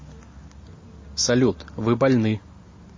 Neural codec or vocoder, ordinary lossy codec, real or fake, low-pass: none; MP3, 32 kbps; real; 7.2 kHz